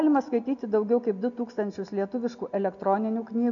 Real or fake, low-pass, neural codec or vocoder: real; 7.2 kHz; none